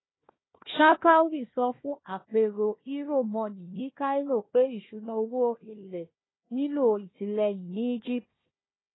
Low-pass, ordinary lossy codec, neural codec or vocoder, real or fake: 7.2 kHz; AAC, 16 kbps; codec, 16 kHz, 1 kbps, FunCodec, trained on Chinese and English, 50 frames a second; fake